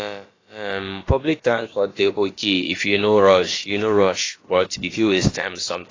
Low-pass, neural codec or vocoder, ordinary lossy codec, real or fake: 7.2 kHz; codec, 16 kHz, about 1 kbps, DyCAST, with the encoder's durations; AAC, 32 kbps; fake